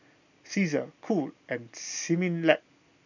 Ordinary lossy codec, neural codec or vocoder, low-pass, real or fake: none; none; 7.2 kHz; real